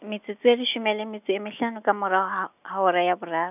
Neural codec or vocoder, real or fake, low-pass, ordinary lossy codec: none; real; 3.6 kHz; none